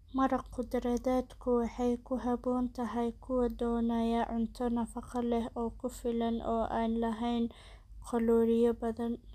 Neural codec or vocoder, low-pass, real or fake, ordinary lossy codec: none; 14.4 kHz; real; none